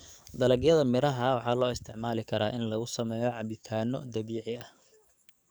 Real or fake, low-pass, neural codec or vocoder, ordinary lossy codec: fake; none; codec, 44.1 kHz, 7.8 kbps, DAC; none